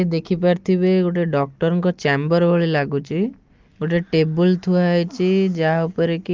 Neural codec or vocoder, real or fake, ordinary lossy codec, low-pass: none; real; Opus, 32 kbps; 7.2 kHz